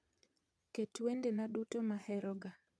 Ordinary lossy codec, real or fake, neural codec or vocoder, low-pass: none; fake; vocoder, 22.05 kHz, 80 mel bands, Vocos; none